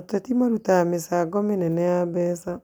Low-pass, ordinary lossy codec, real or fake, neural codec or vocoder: 19.8 kHz; none; real; none